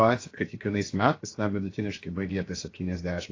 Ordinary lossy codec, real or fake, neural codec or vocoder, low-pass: AAC, 32 kbps; fake; codec, 16 kHz, 0.7 kbps, FocalCodec; 7.2 kHz